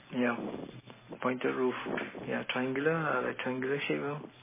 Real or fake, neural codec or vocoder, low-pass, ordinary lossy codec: real; none; 3.6 kHz; MP3, 16 kbps